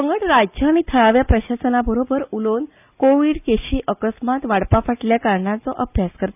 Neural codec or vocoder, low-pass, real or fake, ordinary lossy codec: none; 3.6 kHz; real; none